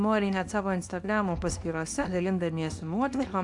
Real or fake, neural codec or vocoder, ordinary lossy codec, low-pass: fake; codec, 24 kHz, 0.9 kbps, WavTokenizer, small release; AAC, 64 kbps; 10.8 kHz